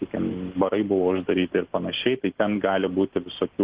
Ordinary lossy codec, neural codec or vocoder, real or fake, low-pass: Opus, 16 kbps; none; real; 3.6 kHz